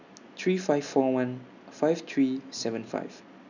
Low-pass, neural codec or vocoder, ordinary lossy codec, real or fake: 7.2 kHz; none; none; real